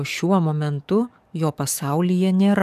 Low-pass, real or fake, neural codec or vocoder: 14.4 kHz; fake; codec, 44.1 kHz, 7.8 kbps, DAC